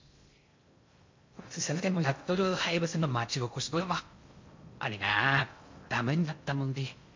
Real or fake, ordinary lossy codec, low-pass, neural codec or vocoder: fake; MP3, 48 kbps; 7.2 kHz; codec, 16 kHz in and 24 kHz out, 0.6 kbps, FocalCodec, streaming, 2048 codes